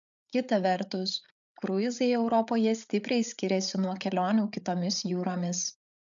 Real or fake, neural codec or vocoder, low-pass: fake; codec, 16 kHz, 8 kbps, FreqCodec, larger model; 7.2 kHz